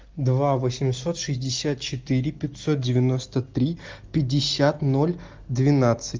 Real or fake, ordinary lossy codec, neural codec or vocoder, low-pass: real; Opus, 32 kbps; none; 7.2 kHz